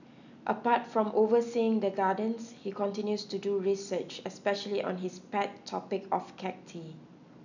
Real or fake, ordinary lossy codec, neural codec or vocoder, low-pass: real; none; none; 7.2 kHz